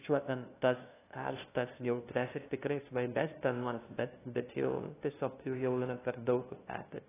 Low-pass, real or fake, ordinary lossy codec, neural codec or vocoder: 3.6 kHz; fake; AAC, 16 kbps; codec, 16 kHz, 0.5 kbps, FunCodec, trained on LibriTTS, 25 frames a second